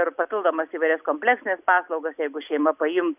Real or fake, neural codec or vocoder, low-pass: real; none; 3.6 kHz